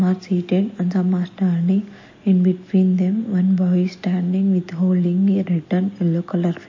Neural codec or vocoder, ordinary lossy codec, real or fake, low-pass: none; MP3, 32 kbps; real; 7.2 kHz